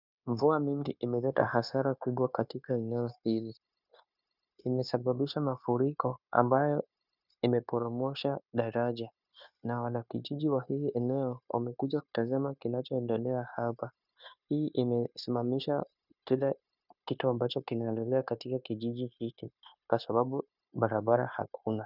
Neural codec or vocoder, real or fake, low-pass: codec, 16 kHz, 0.9 kbps, LongCat-Audio-Codec; fake; 5.4 kHz